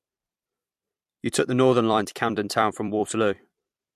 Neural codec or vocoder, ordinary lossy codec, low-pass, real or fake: vocoder, 44.1 kHz, 128 mel bands every 256 samples, BigVGAN v2; MP3, 64 kbps; 14.4 kHz; fake